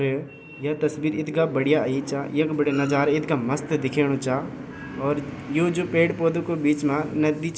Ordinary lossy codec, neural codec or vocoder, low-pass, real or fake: none; none; none; real